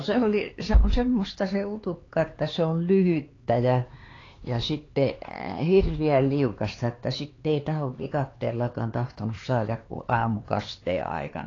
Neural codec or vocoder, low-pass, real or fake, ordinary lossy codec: codec, 16 kHz, 4 kbps, X-Codec, HuBERT features, trained on LibriSpeech; 7.2 kHz; fake; AAC, 32 kbps